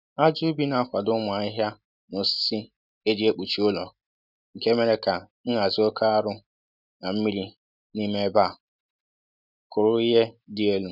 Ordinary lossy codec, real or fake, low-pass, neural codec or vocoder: none; real; 5.4 kHz; none